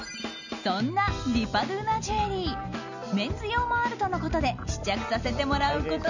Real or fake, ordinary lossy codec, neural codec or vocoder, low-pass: real; none; none; 7.2 kHz